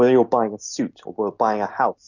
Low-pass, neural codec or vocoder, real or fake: 7.2 kHz; none; real